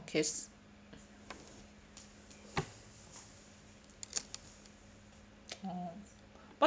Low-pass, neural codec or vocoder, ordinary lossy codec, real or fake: none; none; none; real